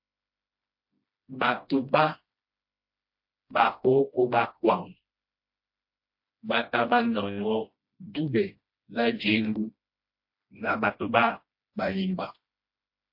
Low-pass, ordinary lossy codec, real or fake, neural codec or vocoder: 5.4 kHz; MP3, 32 kbps; fake; codec, 16 kHz, 1 kbps, FreqCodec, smaller model